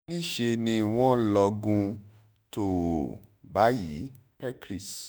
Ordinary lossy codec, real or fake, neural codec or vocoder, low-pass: none; fake; autoencoder, 48 kHz, 32 numbers a frame, DAC-VAE, trained on Japanese speech; none